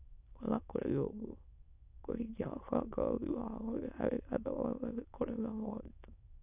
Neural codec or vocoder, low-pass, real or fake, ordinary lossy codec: autoencoder, 22.05 kHz, a latent of 192 numbers a frame, VITS, trained on many speakers; 3.6 kHz; fake; none